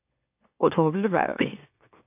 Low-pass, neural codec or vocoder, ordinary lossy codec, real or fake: 3.6 kHz; autoencoder, 44.1 kHz, a latent of 192 numbers a frame, MeloTTS; AAC, 32 kbps; fake